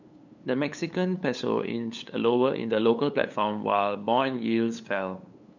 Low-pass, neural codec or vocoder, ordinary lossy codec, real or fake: 7.2 kHz; codec, 16 kHz, 8 kbps, FunCodec, trained on LibriTTS, 25 frames a second; none; fake